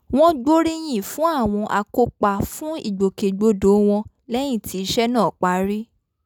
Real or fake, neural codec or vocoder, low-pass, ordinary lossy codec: real; none; none; none